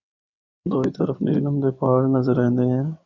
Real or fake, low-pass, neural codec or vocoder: fake; 7.2 kHz; codec, 16 kHz in and 24 kHz out, 2.2 kbps, FireRedTTS-2 codec